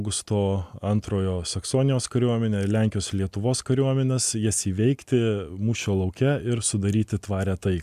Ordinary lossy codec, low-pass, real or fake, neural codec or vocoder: MP3, 96 kbps; 14.4 kHz; real; none